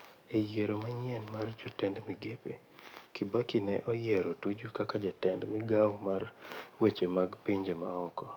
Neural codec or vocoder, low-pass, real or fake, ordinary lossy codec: codec, 44.1 kHz, 7.8 kbps, DAC; 19.8 kHz; fake; none